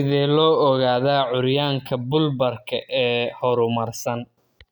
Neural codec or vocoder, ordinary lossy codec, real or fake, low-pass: none; none; real; none